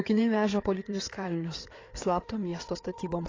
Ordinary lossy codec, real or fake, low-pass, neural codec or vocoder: AAC, 32 kbps; fake; 7.2 kHz; codec, 16 kHz in and 24 kHz out, 2.2 kbps, FireRedTTS-2 codec